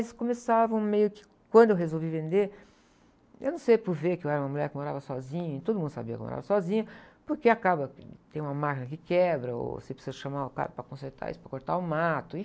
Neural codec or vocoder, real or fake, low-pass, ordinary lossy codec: none; real; none; none